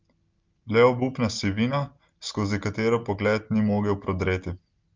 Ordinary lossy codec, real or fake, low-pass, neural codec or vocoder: Opus, 32 kbps; real; 7.2 kHz; none